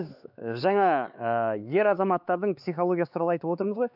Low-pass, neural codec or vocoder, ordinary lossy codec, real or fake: 5.4 kHz; codec, 16 kHz, 4 kbps, X-Codec, HuBERT features, trained on LibriSpeech; none; fake